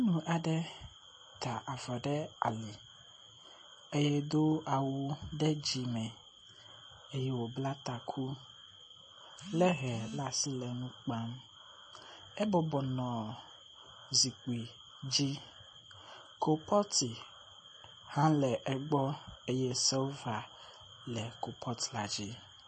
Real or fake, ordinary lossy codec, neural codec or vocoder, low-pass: real; MP3, 32 kbps; none; 10.8 kHz